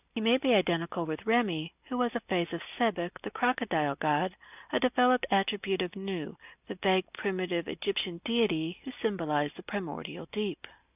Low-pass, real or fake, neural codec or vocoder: 3.6 kHz; real; none